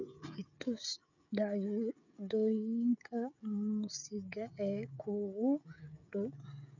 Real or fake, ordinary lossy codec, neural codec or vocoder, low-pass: fake; none; codec, 16 kHz, 16 kbps, FreqCodec, smaller model; 7.2 kHz